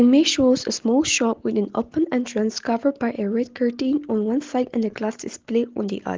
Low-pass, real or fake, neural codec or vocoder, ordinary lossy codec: 7.2 kHz; real; none; Opus, 16 kbps